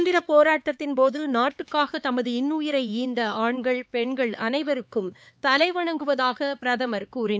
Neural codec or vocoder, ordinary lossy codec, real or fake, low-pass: codec, 16 kHz, 4 kbps, X-Codec, HuBERT features, trained on LibriSpeech; none; fake; none